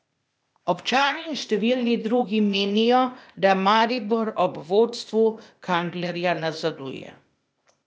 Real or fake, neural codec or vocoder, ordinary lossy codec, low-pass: fake; codec, 16 kHz, 0.8 kbps, ZipCodec; none; none